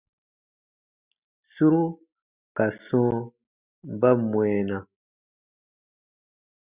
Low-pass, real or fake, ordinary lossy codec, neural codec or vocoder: 3.6 kHz; real; Opus, 64 kbps; none